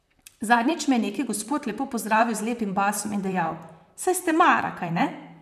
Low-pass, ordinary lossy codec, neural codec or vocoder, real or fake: 14.4 kHz; none; vocoder, 44.1 kHz, 128 mel bands, Pupu-Vocoder; fake